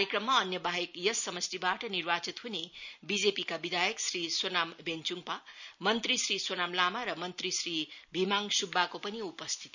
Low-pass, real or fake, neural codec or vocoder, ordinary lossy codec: 7.2 kHz; real; none; none